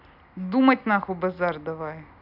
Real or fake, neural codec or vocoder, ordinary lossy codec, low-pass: real; none; none; 5.4 kHz